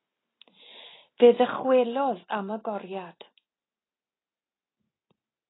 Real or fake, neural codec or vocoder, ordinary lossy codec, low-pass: real; none; AAC, 16 kbps; 7.2 kHz